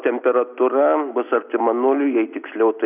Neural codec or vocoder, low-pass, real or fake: none; 3.6 kHz; real